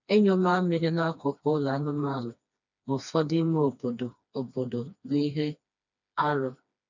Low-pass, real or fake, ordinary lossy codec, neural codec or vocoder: 7.2 kHz; fake; none; codec, 16 kHz, 2 kbps, FreqCodec, smaller model